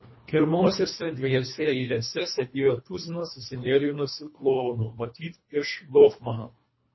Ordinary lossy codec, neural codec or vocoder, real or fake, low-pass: MP3, 24 kbps; codec, 24 kHz, 1.5 kbps, HILCodec; fake; 7.2 kHz